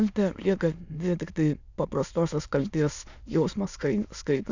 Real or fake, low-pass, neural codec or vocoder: fake; 7.2 kHz; autoencoder, 22.05 kHz, a latent of 192 numbers a frame, VITS, trained on many speakers